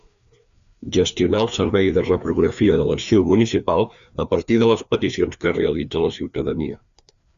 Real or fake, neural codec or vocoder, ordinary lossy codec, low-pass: fake; codec, 16 kHz, 2 kbps, FreqCodec, larger model; Opus, 64 kbps; 7.2 kHz